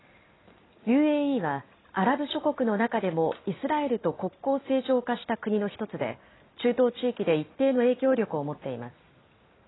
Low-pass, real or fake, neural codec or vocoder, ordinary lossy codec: 7.2 kHz; real; none; AAC, 16 kbps